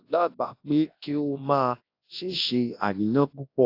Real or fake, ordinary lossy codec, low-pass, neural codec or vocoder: fake; AAC, 32 kbps; 5.4 kHz; codec, 24 kHz, 0.9 kbps, WavTokenizer, large speech release